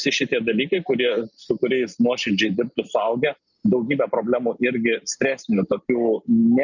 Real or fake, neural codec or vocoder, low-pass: real; none; 7.2 kHz